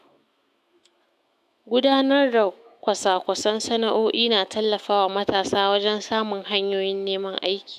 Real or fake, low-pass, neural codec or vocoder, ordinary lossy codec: fake; 14.4 kHz; autoencoder, 48 kHz, 128 numbers a frame, DAC-VAE, trained on Japanese speech; none